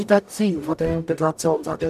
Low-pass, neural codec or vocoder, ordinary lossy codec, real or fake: 14.4 kHz; codec, 44.1 kHz, 0.9 kbps, DAC; none; fake